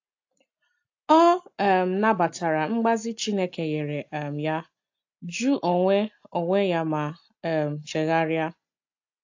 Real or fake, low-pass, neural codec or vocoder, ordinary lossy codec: real; 7.2 kHz; none; AAC, 48 kbps